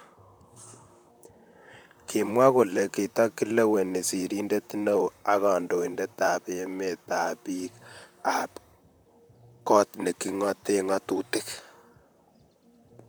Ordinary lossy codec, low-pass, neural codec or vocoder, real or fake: none; none; vocoder, 44.1 kHz, 128 mel bands, Pupu-Vocoder; fake